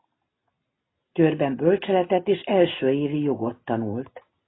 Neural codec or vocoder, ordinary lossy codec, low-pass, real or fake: none; AAC, 16 kbps; 7.2 kHz; real